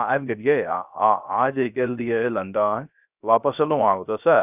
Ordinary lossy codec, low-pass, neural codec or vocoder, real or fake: none; 3.6 kHz; codec, 16 kHz, 0.3 kbps, FocalCodec; fake